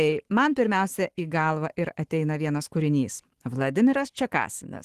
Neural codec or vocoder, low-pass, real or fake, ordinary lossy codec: autoencoder, 48 kHz, 128 numbers a frame, DAC-VAE, trained on Japanese speech; 14.4 kHz; fake; Opus, 16 kbps